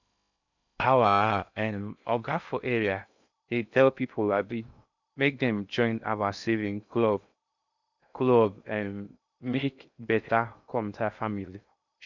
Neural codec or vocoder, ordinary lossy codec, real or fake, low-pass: codec, 16 kHz in and 24 kHz out, 0.6 kbps, FocalCodec, streaming, 2048 codes; none; fake; 7.2 kHz